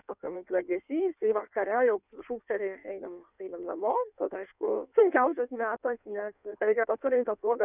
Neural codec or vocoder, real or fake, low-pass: codec, 16 kHz in and 24 kHz out, 1.1 kbps, FireRedTTS-2 codec; fake; 3.6 kHz